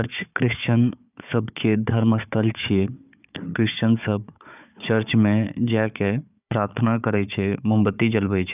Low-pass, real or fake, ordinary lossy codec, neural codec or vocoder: 3.6 kHz; fake; none; codec, 16 kHz, 4 kbps, FunCodec, trained on Chinese and English, 50 frames a second